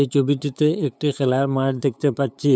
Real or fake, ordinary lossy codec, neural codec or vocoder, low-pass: fake; none; codec, 16 kHz, 8 kbps, FunCodec, trained on LibriTTS, 25 frames a second; none